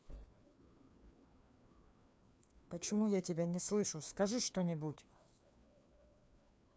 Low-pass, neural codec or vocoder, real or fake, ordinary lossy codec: none; codec, 16 kHz, 2 kbps, FreqCodec, larger model; fake; none